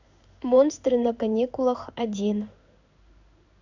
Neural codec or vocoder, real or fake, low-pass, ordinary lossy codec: codec, 16 kHz in and 24 kHz out, 1 kbps, XY-Tokenizer; fake; 7.2 kHz; none